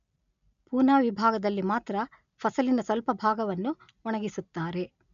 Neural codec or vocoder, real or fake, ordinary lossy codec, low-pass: none; real; none; 7.2 kHz